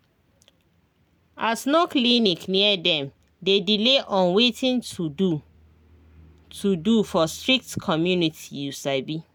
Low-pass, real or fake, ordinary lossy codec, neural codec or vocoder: none; real; none; none